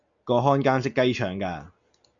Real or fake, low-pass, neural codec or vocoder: real; 7.2 kHz; none